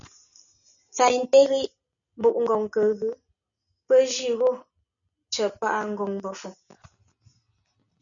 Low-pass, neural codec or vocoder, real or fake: 7.2 kHz; none; real